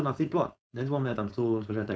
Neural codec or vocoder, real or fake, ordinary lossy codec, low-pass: codec, 16 kHz, 4.8 kbps, FACodec; fake; none; none